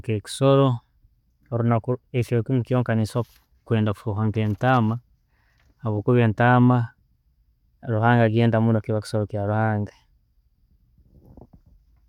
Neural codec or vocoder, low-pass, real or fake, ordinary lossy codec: none; 19.8 kHz; real; none